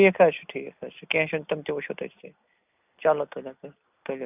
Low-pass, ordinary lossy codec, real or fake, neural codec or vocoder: 3.6 kHz; none; real; none